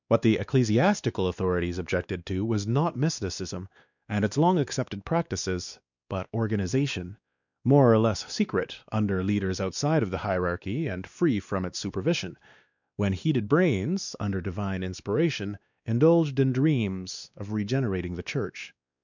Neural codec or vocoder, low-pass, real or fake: codec, 16 kHz, 2 kbps, X-Codec, WavLM features, trained on Multilingual LibriSpeech; 7.2 kHz; fake